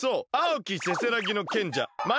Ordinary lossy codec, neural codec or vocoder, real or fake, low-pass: none; none; real; none